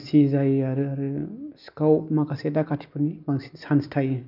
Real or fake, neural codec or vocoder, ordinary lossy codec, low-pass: real; none; none; 5.4 kHz